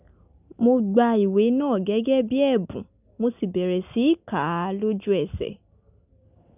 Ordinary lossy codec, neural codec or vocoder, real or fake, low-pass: none; none; real; 3.6 kHz